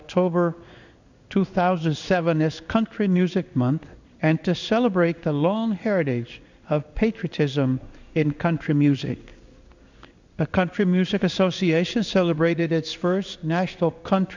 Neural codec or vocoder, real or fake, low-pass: codec, 16 kHz in and 24 kHz out, 1 kbps, XY-Tokenizer; fake; 7.2 kHz